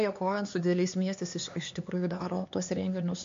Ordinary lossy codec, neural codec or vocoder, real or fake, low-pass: MP3, 48 kbps; codec, 16 kHz, 4 kbps, X-Codec, HuBERT features, trained on LibriSpeech; fake; 7.2 kHz